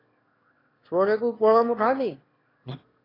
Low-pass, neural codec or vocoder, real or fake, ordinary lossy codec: 5.4 kHz; autoencoder, 22.05 kHz, a latent of 192 numbers a frame, VITS, trained on one speaker; fake; AAC, 24 kbps